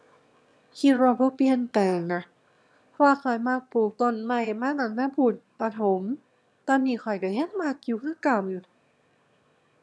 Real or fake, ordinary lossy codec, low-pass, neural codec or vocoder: fake; none; none; autoencoder, 22.05 kHz, a latent of 192 numbers a frame, VITS, trained on one speaker